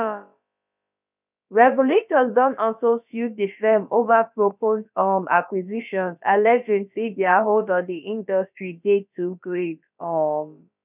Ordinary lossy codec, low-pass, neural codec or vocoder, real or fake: none; 3.6 kHz; codec, 16 kHz, about 1 kbps, DyCAST, with the encoder's durations; fake